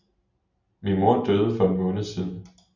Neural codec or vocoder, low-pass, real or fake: none; 7.2 kHz; real